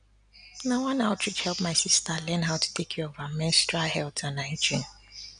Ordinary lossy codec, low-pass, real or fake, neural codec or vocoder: none; 9.9 kHz; real; none